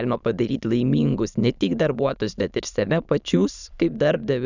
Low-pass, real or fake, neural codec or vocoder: 7.2 kHz; fake; autoencoder, 22.05 kHz, a latent of 192 numbers a frame, VITS, trained on many speakers